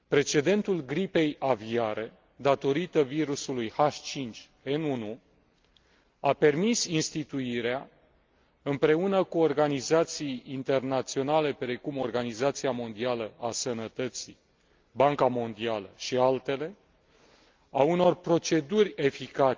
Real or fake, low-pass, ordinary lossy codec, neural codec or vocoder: real; 7.2 kHz; Opus, 24 kbps; none